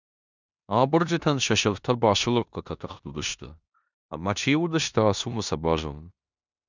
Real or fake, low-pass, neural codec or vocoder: fake; 7.2 kHz; codec, 16 kHz in and 24 kHz out, 0.9 kbps, LongCat-Audio-Codec, four codebook decoder